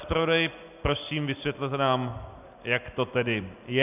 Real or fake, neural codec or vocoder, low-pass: real; none; 3.6 kHz